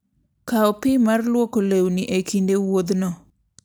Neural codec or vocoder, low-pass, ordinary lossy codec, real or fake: none; none; none; real